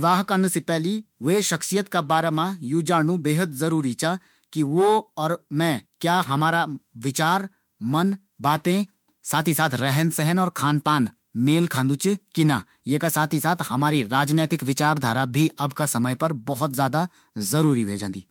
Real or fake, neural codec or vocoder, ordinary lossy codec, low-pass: fake; autoencoder, 48 kHz, 32 numbers a frame, DAC-VAE, trained on Japanese speech; MP3, 96 kbps; 19.8 kHz